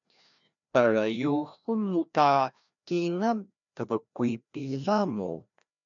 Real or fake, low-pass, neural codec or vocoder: fake; 7.2 kHz; codec, 16 kHz, 1 kbps, FreqCodec, larger model